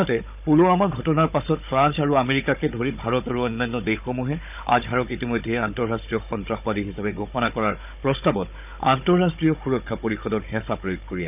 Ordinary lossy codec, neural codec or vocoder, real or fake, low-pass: none; codec, 16 kHz, 16 kbps, FunCodec, trained on Chinese and English, 50 frames a second; fake; 3.6 kHz